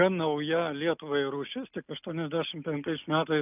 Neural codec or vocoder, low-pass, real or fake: none; 3.6 kHz; real